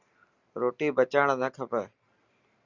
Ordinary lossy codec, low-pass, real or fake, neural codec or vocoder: Opus, 64 kbps; 7.2 kHz; real; none